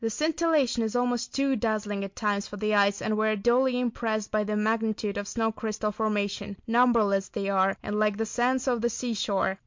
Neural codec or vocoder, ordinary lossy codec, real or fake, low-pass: none; MP3, 48 kbps; real; 7.2 kHz